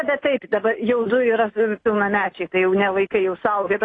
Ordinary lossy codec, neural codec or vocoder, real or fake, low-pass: AAC, 32 kbps; none; real; 9.9 kHz